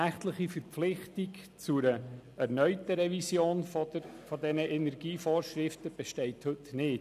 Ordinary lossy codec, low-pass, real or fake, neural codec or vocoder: none; 14.4 kHz; real; none